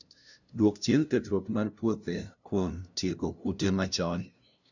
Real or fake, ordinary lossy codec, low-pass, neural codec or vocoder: fake; none; 7.2 kHz; codec, 16 kHz, 0.5 kbps, FunCodec, trained on LibriTTS, 25 frames a second